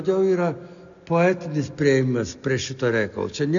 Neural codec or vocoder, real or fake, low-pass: none; real; 7.2 kHz